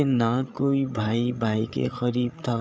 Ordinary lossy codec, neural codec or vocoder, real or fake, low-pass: none; codec, 16 kHz, 8 kbps, FreqCodec, larger model; fake; none